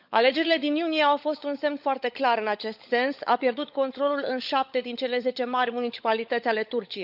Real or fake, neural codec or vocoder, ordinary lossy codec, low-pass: fake; codec, 16 kHz, 16 kbps, FunCodec, trained on LibriTTS, 50 frames a second; none; 5.4 kHz